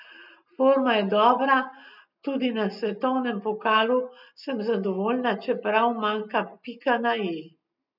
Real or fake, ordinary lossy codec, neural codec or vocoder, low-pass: real; none; none; 5.4 kHz